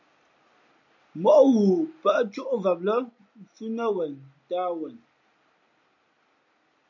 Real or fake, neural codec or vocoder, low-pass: real; none; 7.2 kHz